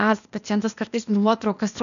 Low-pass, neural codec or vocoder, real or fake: 7.2 kHz; codec, 16 kHz, 0.8 kbps, ZipCodec; fake